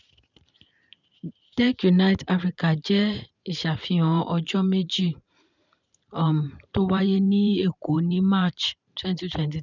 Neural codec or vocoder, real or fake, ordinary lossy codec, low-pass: vocoder, 44.1 kHz, 128 mel bands every 512 samples, BigVGAN v2; fake; none; 7.2 kHz